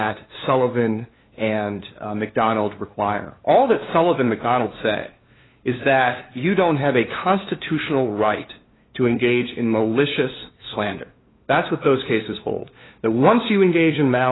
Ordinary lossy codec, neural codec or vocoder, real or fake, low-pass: AAC, 16 kbps; codec, 16 kHz, 2 kbps, FunCodec, trained on LibriTTS, 25 frames a second; fake; 7.2 kHz